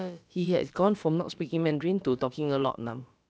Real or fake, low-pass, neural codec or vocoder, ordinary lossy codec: fake; none; codec, 16 kHz, about 1 kbps, DyCAST, with the encoder's durations; none